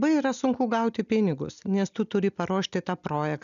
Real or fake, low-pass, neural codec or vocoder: real; 7.2 kHz; none